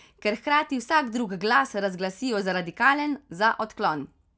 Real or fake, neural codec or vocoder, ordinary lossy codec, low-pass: real; none; none; none